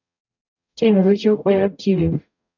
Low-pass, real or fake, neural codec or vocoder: 7.2 kHz; fake; codec, 44.1 kHz, 0.9 kbps, DAC